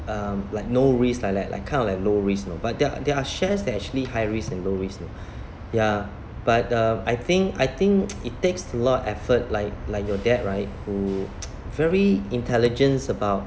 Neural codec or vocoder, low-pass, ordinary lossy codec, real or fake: none; none; none; real